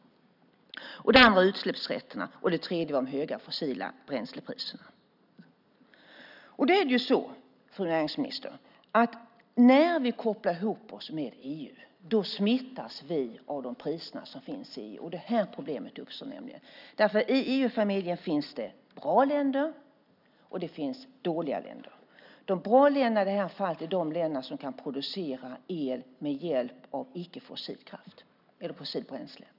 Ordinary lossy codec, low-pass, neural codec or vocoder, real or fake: none; 5.4 kHz; none; real